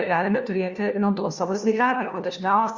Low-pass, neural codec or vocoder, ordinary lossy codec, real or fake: 7.2 kHz; codec, 16 kHz, 1 kbps, FunCodec, trained on LibriTTS, 50 frames a second; Opus, 64 kbps; fake